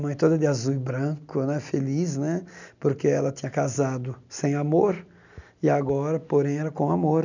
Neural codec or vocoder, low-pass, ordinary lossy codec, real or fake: none; 7.2 kHz; none; real